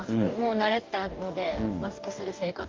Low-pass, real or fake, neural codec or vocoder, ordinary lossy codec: 7.2 kHz; fake; codec, 44.1 kHz, 2.6 kbps, DAC; Opus, 24 kbps